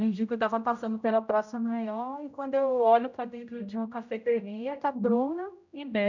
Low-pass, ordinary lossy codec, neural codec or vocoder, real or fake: 7.2 kHz; none; codec, 16 kHz, 0.5 kbps, X-Codec, HuBERT features, trained on general audio; fake